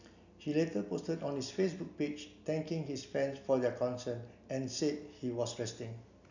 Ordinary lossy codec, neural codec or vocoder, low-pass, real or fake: none; none; 7.2 kHz; real